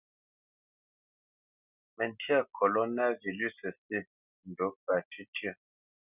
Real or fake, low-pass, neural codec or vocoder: real; 3.6 kHz; none